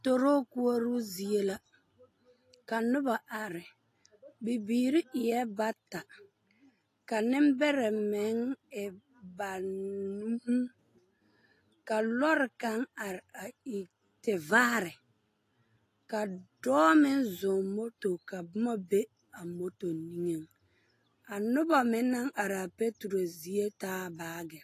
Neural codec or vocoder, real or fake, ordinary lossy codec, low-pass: none; real; AAC, 48 kbps; 14.4 kHz